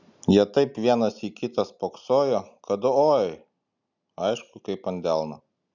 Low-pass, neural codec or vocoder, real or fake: 7.2 kHz; none; real